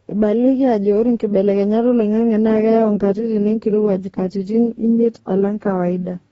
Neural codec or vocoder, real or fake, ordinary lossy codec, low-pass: codec, 44.1 kHz, 2.6 kbps, DAC; fake; AAC, 24 kbps; 19.8 kHz